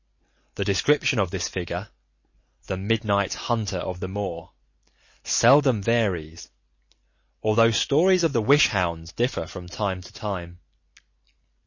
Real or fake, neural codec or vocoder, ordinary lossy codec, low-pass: real; none; MP3, 32 kbps; 7.2 kHz